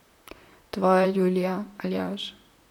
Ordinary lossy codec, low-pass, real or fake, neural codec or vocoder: none; 19.8 kHz; fake; vocoder, 44.1 kHz, 128 mel bands, Pupu-Vocoder